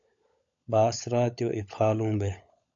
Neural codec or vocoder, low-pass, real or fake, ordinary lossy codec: codec, 16 kHz, 16 kbps, FunCodec, trained on LibriTTS, 50 frames a second; 7.2 kHz; fake; AAC, 64 kbps